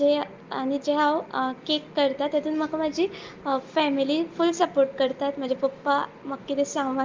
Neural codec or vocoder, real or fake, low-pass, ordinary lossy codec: none; real; 7.2 kHz; Opus, 32 kbps